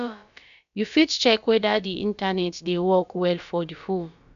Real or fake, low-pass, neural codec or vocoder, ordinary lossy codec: fake; 7.2 kHz; codec, 16 kHz, about 1 kbps, DyCAST, with the encoder's durations; none